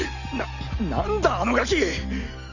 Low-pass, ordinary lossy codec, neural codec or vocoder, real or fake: 7.2 kHz; none; none; real